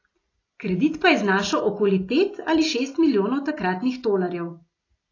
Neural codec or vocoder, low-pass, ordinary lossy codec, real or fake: none; 7.2 kHz; AAC, 32 kbps; real